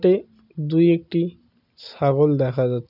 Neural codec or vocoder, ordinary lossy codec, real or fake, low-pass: none; none; real; 5.4 kHz